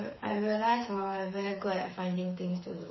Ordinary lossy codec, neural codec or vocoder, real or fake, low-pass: MP3, 24 kbps; codec, 16 kHz, 4 kbps, FreqCodec, smaller model; fake; 7.2 kHz